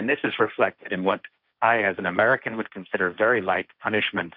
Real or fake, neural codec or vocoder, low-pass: fake; codec, 16 kHz, 1.1 kbps, Voila-Tokenizer; 5.4 kHz